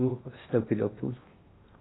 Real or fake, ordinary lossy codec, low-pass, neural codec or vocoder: fake; AAC, 16 kbps; 7.2 kHz; codec, 16 kHz in and 24 kHz out, 0.6 kbps, FocalCodec, streaming, 4096 codes